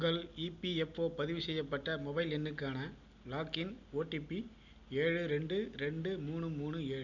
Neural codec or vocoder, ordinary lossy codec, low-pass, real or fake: none; none; 7.2 kHz; real